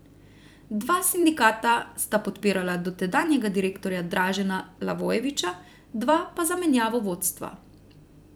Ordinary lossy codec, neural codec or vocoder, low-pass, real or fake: none; vocoder, 44.1 kHz, 128 mel bands every 256 samples, BigVGAN v2; none; fake